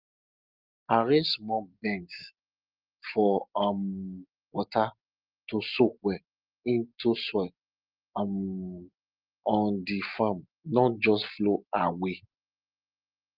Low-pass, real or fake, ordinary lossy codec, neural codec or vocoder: 5.4 kHz; real; Opus, 24 kbps; none